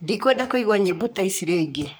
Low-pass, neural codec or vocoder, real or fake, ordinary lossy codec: none; codec, 44.1 kHz, 3.4 kbps, Pupu-Codec; fake; none